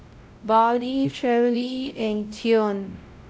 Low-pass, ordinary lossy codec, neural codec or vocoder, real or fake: none; none; codec, 16 kHz, 0.5 kbps, X-Codec, WavLM features, trained on Multilingual LibriSpeech; fake